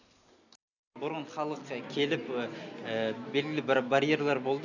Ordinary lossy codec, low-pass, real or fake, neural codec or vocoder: none; 7.2 kHz; real; none